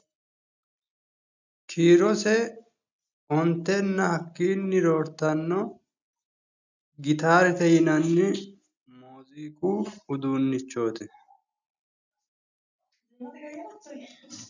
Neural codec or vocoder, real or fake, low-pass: none; real; 7.2 kHz